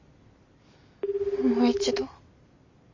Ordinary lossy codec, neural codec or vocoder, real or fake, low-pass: MP3, 48 kbps; none; real; 7.2 kHz